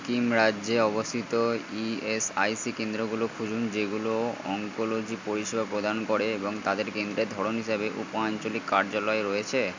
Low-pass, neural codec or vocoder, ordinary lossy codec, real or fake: 7.2 kHz; none; MP3, 64 kbps; real